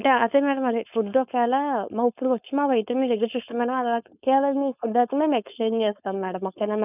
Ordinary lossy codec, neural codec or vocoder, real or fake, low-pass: none; codec, 16 kHz, 4.8 kbps, FACodec; fake; 3.6 kHz